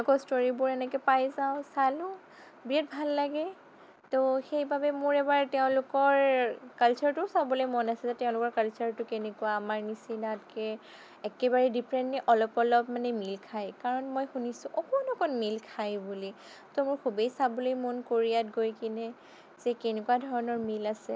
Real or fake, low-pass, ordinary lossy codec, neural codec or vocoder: real; none; none; none